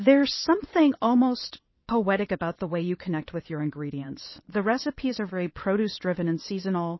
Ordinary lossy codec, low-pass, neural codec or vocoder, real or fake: MP3, 24 kbps; 7.2 kHz; vocoder, 22.05 kHz, 80 mel bands, WaveNeXt; fake